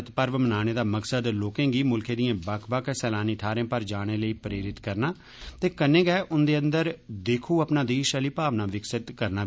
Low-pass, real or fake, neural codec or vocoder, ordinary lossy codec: none; real; none; none